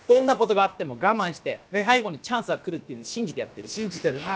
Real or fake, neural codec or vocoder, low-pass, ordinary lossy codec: fake; codec, 16 kHz, about 1 kbps, DyCAST, with the encoder's durations; none; none